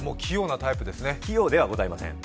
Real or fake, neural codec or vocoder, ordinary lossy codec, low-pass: real; none; none; none